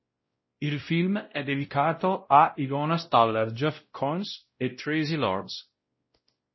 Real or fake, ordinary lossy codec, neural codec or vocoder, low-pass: fake; MP3, 24 kbps; codec, 16 kHz, 0.5 kbps, X-Codec, WavLM features, trained on Multilingual LibriSpeech; 7.2 kHz